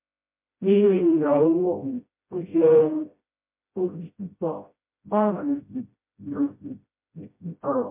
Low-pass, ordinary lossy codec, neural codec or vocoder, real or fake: 3.6 kHz; MP3, 24 kbps; codec, 16 kHz, 0.5 kbps, FreqCodec, smaller model; fake